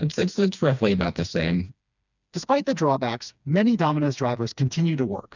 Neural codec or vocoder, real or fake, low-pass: codec, 16 kHz, 2 kbps, FreqCodec, smaller model; fake; 7.2 kHz